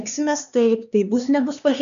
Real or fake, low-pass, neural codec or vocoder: fake; 7.2 kHz; codec, 16 kHz, 2 kbps, X-Codec, HuBERT features, trained on LibriSpeech